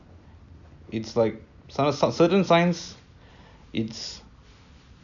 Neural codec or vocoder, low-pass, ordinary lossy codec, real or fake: none; 7.2 kHz; none; real